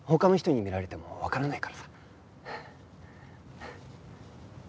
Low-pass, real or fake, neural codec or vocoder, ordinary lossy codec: none; real; none; none